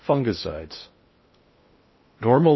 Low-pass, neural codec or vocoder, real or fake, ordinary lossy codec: 7.2 kHz; codec, 16 kHz in and 24 kHz out, 0.6 kbps, FocalCodec, streaming, 2048 codes; fake; MP3, 24 kbps